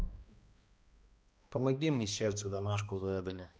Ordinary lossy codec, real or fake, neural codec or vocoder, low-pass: none; fake; codec, 16 kHz, 1 kbps, X-Codec, HuBERT features, trained on balanced general audio; none